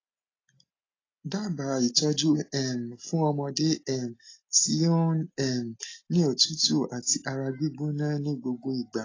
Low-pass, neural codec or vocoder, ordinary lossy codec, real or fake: 7.2 kHz; none; AAC, 32 kbps; real